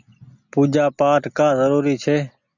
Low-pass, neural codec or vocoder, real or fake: 7.2 kHz; none; real